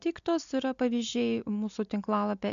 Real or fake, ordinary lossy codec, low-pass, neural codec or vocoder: real; MP3, 64 kbps; 7.2 kHz; none